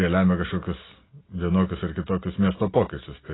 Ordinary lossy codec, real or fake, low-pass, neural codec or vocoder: AAC, 16 kbps; real; 7.2 kHz; none